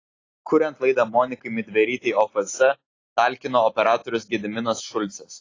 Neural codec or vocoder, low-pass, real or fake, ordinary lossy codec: none; 7.2 kHz; real; AAC, 32 kbps